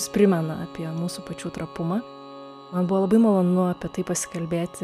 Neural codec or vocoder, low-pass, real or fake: none; 14.4 kHz; real